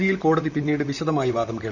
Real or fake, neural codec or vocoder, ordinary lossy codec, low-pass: fake; vocoder, 44.1 kHz, 128 mel bands, Pupu-Vocoder; none; 7.2 kHz